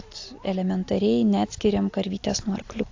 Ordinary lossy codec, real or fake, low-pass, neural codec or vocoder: AAC, 48 kbps; real; 7.2 kHz; none